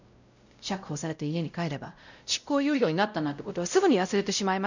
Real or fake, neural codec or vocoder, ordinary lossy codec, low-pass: fake; codec, 16 kHz, 0.5 kbps, X-Codec, WavLM features, trained on Multilingual LibriSpeech; none; 7.2 kHz